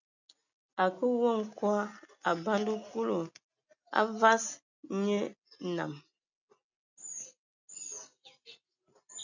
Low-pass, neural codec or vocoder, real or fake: 7.2 kHz; none; real